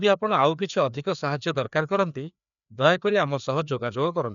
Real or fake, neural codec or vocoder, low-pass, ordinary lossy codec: fake; codec, 16 kHz, 2 kbps, FreqCodec, larger model; 7.2 kHz; none